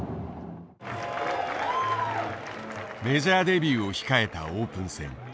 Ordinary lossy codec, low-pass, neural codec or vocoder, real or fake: none; none; none; real